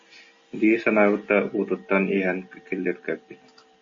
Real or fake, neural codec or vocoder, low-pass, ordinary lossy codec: real; none; 7.2 kHz; MP3, 32 kbps